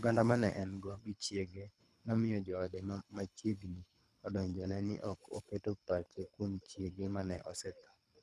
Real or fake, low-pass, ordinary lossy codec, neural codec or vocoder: fake; none; none; codec, 24 kHz, 3 kbps, HILCodec